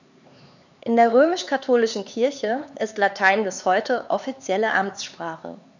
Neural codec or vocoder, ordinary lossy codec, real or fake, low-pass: codec, 16 kHz, 4 kbps, X-Codec, HuBERT features, trained on LibriSpeech; none; fake; 7.2 kHz